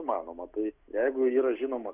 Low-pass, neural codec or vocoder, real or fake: 3.6 kHz; none; real